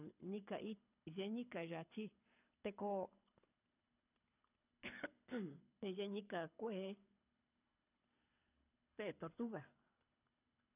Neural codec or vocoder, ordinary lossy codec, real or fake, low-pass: codec, 24 kHz, 6 kbps, HILCodec; none; fake; 3.6 kHz